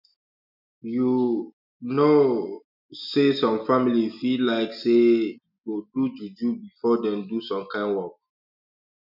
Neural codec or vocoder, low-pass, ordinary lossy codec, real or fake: none; 5.4 kHz; none; real